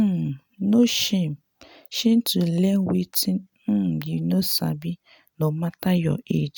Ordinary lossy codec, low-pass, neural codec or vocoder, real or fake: none; none; none; real